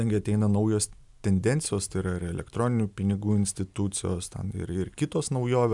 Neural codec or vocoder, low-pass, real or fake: none; 10.8 kHz; real